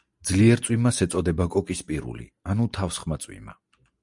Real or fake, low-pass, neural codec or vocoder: real; 10.8 kHz; none